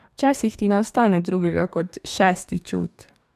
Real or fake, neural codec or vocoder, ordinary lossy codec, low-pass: fake; codec, 44.1 kHz, 2.6 kbps, DAC; none; 14.4 kHz